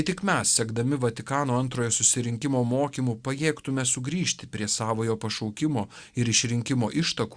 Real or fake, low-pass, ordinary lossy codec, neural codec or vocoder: real; 9.9 kHz; Opus, 64 kbps; none